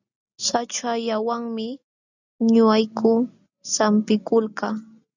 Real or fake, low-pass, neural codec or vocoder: real; 7.2 kHz; none